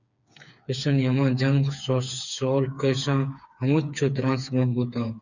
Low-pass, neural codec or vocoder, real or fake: 7.2 kHz; codec, 16 kHz, 4 kbps, FreqCodec, smaller model; fake